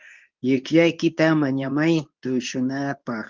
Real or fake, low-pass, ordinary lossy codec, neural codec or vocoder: fake; 7.2 kHz; Opus, 32 kbps; codec, 24 kHz, 0.9 kbps, WavTokenizer, medium speech release version 2